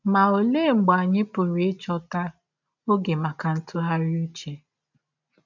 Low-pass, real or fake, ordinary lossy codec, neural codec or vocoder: 7.2 kHz; real; none; none